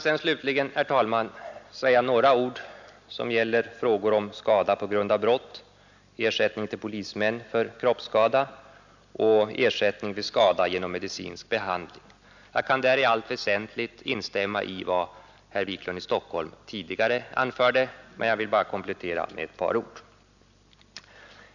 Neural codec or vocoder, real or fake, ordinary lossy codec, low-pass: none; real; none; 7.2 kHz